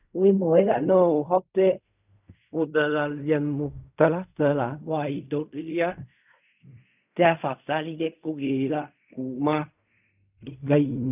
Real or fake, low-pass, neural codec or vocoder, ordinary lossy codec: fake; 3.6 kHz; codec, 16 kHz in and 24 kHz out, 0.4 kbps, LongCat-Audio-Codec, fine tuned four codebook decoder; none